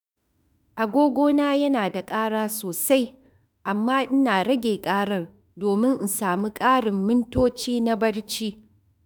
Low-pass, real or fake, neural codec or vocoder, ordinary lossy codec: none; fake; autoencoder, 48 kHz, 32 numbers a frame, DAC-VAE, trained on Japanese speech; none